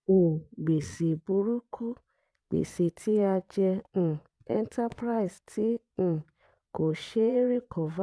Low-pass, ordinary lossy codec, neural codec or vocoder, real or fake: none; none; vocoder, 22.05 kHz, 80 mel bands, Vocos; fake